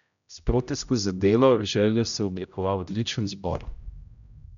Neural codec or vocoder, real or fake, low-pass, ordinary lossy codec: codec, 16 kHz, 0.5 kbps, X-Codec, HuBERT features, trained on general audio; fake; 7.2 kHz; none